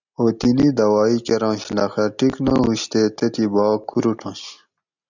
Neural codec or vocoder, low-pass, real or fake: none; 7.2 kHz; real